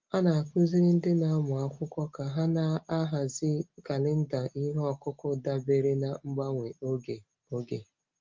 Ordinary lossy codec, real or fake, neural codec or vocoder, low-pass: Opus, 32 kbps; real; none; 7.2 kHz